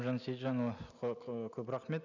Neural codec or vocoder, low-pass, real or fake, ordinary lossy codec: none; 7.2 kHz; real; none